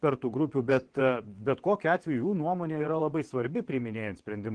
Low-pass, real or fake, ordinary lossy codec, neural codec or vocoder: 9.9 kHz; fake; Opus, 16 kbps; vocoder, 22.05 kHz, 80 mel bands, WaveNeXt